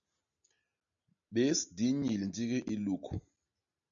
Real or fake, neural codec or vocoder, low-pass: real; none; 7.2 kHz